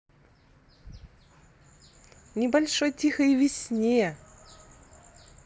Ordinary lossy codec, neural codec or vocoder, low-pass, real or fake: none; none; none; real